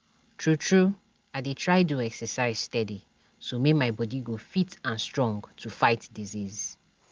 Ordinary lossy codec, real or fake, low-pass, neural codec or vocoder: Opus, 24 kbps; real; 7.2 kHz; none